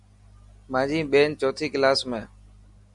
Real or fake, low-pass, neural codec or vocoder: real; 10.8 kHz; none